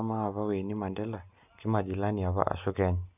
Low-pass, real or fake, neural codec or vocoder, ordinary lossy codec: 3.6 kHz; real; none; none